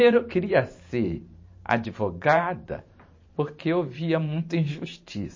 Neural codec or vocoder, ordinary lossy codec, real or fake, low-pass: vocoder, 44.1 kHz, 128 mel bands every 512 samples, BigVGAN v2; MP3, 32 kbps; fake; 7.2 kHz